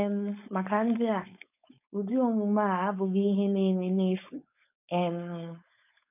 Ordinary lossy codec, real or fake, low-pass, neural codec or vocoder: none; fake; 3.6 kHz; codec, 16 kHz, 4.8 kbps, FACodec